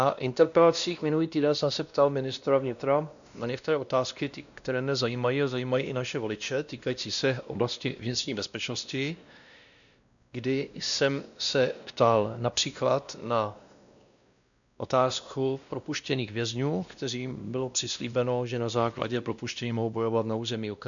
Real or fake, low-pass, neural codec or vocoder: fake; 7.2 kHz; codec, 16 kHz, 1 kbps, X-Codec, WavLM features, trained on Multilingual LibriSpeech